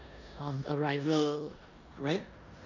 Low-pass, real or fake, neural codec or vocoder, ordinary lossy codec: 7.2 kHz; fake; codec, 16 kHz in and 24 kHz out, 0.9 kbps, LongCat-Audio-Codec, four codebook decoder; none